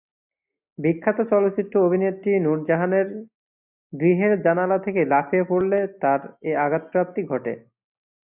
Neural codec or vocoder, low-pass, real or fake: none; 3.6 kHz; real